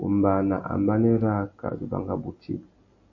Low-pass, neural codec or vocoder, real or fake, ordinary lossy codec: 7.2 kHz; none; real; MP3, 32 kbps